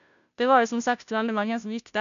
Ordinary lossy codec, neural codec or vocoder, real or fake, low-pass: none; codec, 16 kHz, 0.5 kbps, FunCodec, trained on Chinese and English, 25 frames a second; fake; 7.2 kHz